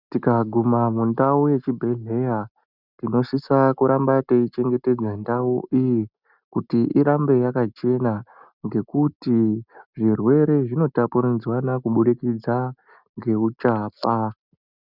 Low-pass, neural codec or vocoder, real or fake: 5.4 kHz; none; real